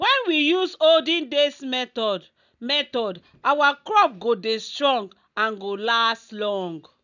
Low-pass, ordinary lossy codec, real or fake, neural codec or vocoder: 7.2 kHz; none; real; none